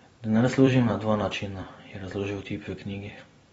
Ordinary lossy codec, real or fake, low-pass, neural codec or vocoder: AAC, 24 kbps; fake; 19.8 kHz; vocoder, 44.1 kHz, 128 mel bands every 256 samples, BigVGAN v2